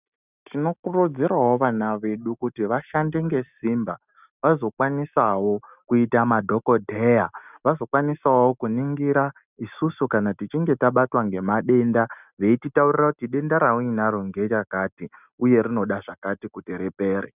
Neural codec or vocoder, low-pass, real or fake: none; 3.6 kHz; real